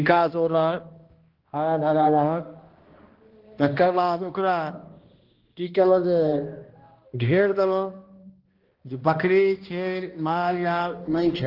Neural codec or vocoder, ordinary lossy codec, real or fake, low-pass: codec, 16 kHz, 1 kbps, X-Codec, HuBERT features, trained on balanced general audio; Opus, 16 kbps; fake; 5.4 kHz